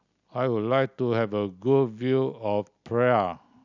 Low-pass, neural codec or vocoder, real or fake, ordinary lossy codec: 7.2 kHz; none; real; none